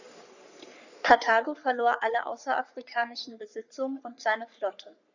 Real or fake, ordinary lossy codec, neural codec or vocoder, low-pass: fake; none; codec, 44.1 kHz, 3.4 kbps, Pupu-Codec; 7.2 kHz